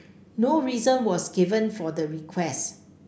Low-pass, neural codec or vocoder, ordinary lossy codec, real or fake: none; none; none; real